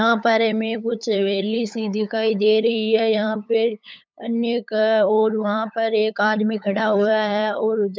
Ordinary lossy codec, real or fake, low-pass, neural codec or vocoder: none; fake; none; codec, 16 kHz, 8 kbps, FunCodec, trained on LibriTTS, 25 frames a second